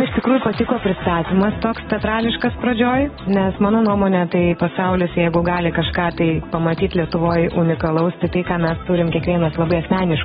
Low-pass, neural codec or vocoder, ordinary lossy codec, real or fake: 19.8 kHz; none; AAC, 16 kbps; real